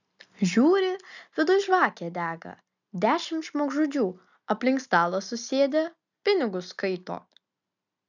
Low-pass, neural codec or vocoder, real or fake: 7.2 kHz; none; real